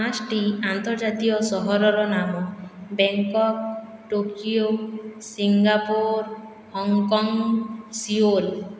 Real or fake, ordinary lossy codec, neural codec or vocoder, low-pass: real; none; none; none